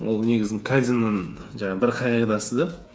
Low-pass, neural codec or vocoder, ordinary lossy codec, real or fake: none; codec, 16 kHz, 8 kbps, FreqCodec, smaller model; none; fake